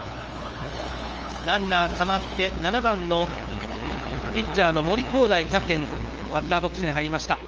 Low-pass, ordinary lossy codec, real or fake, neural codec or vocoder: 7.2 kHz; Opus, 24 kbps; fake; codec, 16 kHz, 2 kbps, FunCodec, trained on LibriTTS, 25 frames a second